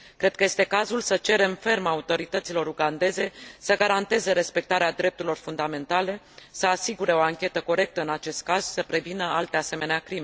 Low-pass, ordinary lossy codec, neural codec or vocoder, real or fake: none; none; none; real